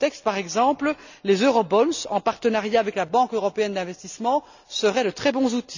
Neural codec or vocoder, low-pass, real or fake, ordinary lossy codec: none; 7.2 kHz; real; none